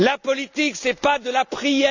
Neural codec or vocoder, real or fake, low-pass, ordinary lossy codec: none; real; 7.2 kHz; none